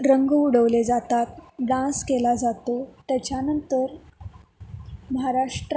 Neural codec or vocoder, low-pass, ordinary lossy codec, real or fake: none; none; none; real